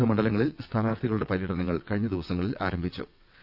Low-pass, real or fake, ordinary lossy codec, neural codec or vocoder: 5.4 kHz; fake; none; vocoder, 22.05 kHz, 80 mel bands, WaveNeXt